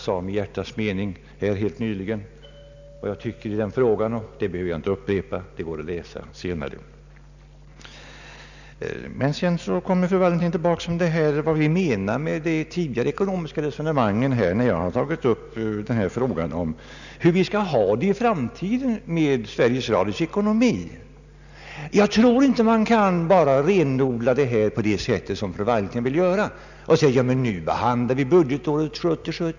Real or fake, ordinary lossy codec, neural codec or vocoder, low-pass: real; none; none; 7.2 kHz